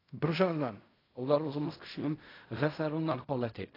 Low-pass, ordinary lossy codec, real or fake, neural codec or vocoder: 5.4 kHz; AAC, 24 kbps; fake; codec, 16 kHz in and 24 kHz out, 0.4 kbps, LongCat-Audio-Codec, fine tuned four codebook decoder